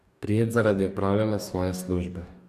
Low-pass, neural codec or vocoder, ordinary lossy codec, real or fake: 14.4 kHz; codec, 44.1 kHz, 2.6 kbps, DAC; none; fake